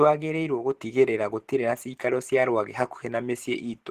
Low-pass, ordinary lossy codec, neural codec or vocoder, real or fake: 14.4 kHz; Opus, 16 kbps; vocoder, 44.1 kHz, 128 mel bands every 512 samples, BigVGAN v2; fake